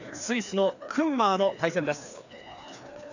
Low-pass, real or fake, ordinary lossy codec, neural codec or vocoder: 7.2 kHz; fake; none; codec, 16 kHz, 2 kbps, FreqCodec, larger model